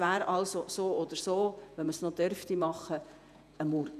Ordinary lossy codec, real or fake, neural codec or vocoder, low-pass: none; fake; vocoder, 48 kHz, 128 mel bands, Vocos; 14.4 kHz